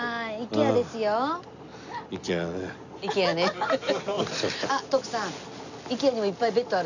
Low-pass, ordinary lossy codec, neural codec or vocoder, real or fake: 7.2 kHz; none; none; real